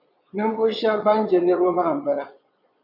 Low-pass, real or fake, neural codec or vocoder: 5.4 kHz; fake; vocoder, 22.05 kHz, 80 mel bands, Vocos